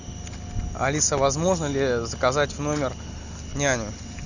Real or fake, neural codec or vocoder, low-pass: real; none; 7.2 kHz